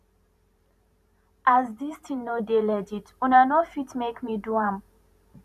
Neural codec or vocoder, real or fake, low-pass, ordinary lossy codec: vocoder, 44.1 kHz, 128 mel bands every 512 samples, BigVGAN v2; fake; 14.4 kHz; none